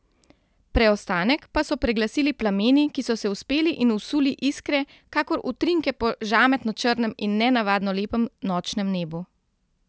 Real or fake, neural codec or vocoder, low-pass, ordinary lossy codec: real; none; none; none